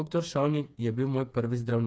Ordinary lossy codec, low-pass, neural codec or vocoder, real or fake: none; none; codec, 16 kHz, 4 kbps, FreqCodec, smaller model; fake